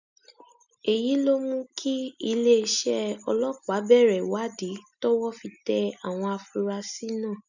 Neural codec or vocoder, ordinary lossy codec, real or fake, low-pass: none; none; real; 7.2 kHz